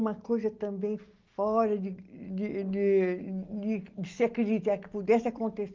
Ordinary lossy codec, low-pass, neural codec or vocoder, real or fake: Opus, 24 kbps; 7.2 kHz; none; real